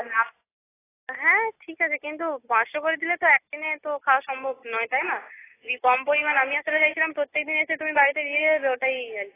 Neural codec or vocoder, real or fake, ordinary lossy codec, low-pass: none; real; AAC, 16 kbps; 3.6 kHz